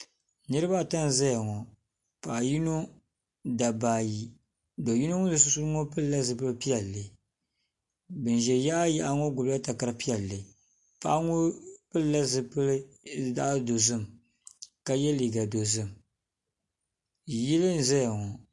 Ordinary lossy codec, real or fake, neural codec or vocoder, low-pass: MP3, 48 kbps; real; none; 10.8 kHz